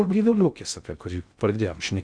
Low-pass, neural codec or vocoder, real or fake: 9.9 kHz; codec, 16 kHz in and 24 kHz out, 0.6 kbps, FocalCodec, streaming, 2048 codes; fake